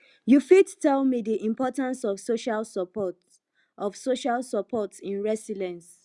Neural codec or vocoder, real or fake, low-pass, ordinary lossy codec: none; real; 10.8 kHz; Opus, 64 kbps